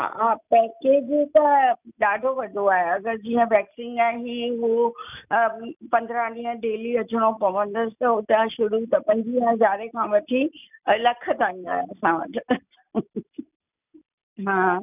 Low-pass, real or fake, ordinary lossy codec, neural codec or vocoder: 3.6 kHz; real; none; none